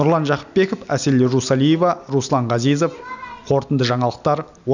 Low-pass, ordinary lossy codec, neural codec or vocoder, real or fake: 7.2 kHz; none; none; real